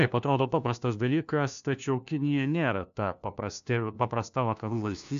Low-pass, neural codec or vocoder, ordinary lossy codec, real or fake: 7.2 kHz; codec, 16 kHz, 1 kbps, FunCodec, trained on LibriTTS, 50 frames a second; AAC, 96 kbps; fake